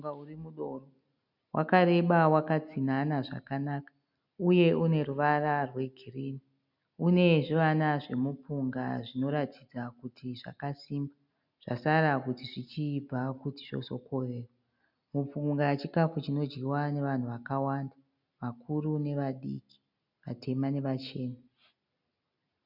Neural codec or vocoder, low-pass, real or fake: none; 5.4 kHz; real